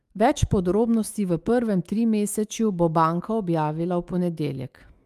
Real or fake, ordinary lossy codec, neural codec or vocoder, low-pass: fake; Opus, 32 kbps; autoencoder, 48 kHz, 128 numbers a frame, DAC-VAE, trained on Japanese speech; 14.4 kHz